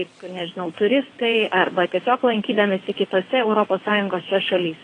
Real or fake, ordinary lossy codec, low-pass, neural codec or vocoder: fake; AAC, 32 kbps; 9.9 kHz; vocoder, 22.05 kHz, 80 mel bands, WaveNeXt